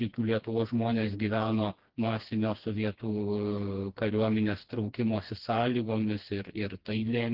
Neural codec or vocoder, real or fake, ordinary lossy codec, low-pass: codec, 16 kHz, 2 kbps, FreqCodec, smaller model; fake; Opus, 16 kbps; 5.4 kHz